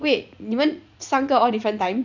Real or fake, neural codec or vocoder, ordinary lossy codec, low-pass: fake; vocoder, 44.1 kHz, 80 mel bands, Vocos; none; 7.2 kHz